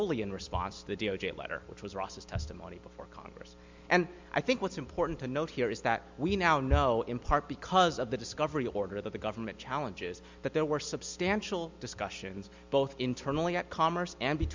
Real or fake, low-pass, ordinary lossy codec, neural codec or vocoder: real; 7.2 kHz; MP3, 64 kbps; none